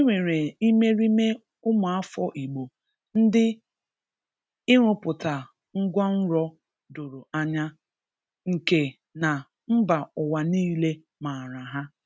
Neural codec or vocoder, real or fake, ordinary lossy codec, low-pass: none; real; none; none